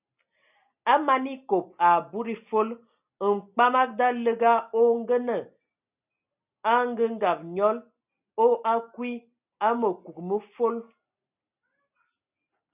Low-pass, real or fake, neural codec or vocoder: 3.6 kHz; real; none